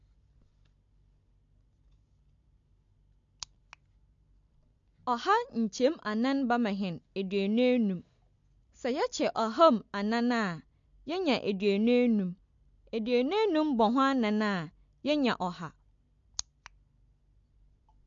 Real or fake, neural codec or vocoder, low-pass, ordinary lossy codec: real; none; 7.2 kHz; MP3, 48 kbps